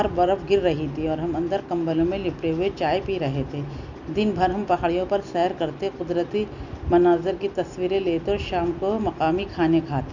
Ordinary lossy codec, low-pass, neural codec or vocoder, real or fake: none; 7.2 kHz; none; real